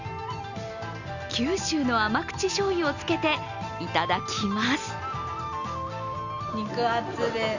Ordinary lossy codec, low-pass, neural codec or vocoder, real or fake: none; 7.2 kHz; none; real